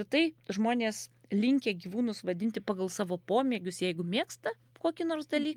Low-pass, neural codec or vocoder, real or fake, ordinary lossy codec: 19.8 kHz; none; real; Opus, 32 kbps